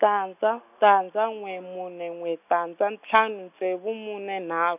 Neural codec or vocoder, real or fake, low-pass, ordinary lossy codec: none; real; 3.6 kHz; none